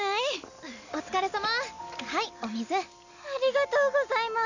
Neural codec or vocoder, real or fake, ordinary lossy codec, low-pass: none; real; none; 7.2 kHz